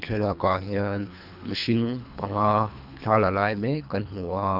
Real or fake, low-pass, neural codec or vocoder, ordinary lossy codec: fake; 5.4 kHz; codec, 24 kHz, 3 kbps, HILCodec; none